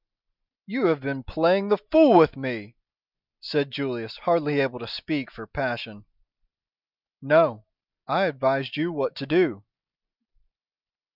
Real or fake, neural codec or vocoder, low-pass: real; none; 5.4 kHz